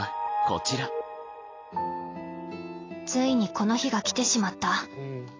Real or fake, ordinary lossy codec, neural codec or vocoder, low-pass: real; AAC, 32 kbps; none; 7.2 kHz